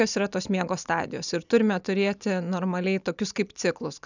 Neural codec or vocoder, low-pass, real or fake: none; 7.2 kHz; real